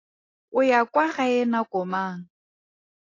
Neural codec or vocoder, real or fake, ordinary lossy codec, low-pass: none; real; AAC, 32 kbps; 7.2 kHz